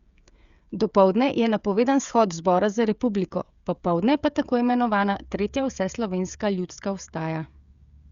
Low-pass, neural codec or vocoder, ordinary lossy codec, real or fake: 7.2 kHz; codec, 16 kHz, 16 kbps, FreqCodec, smaller model; Opus, 64 kbps; fake